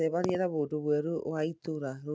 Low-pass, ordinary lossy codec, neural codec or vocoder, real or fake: none; none; none; real